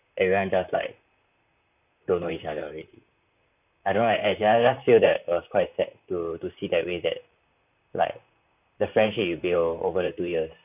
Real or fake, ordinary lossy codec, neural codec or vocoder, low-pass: fake; none; vocoder, 44.1 kHz, 128 mel bands, Pupu-Vocoder; 3.6 kHz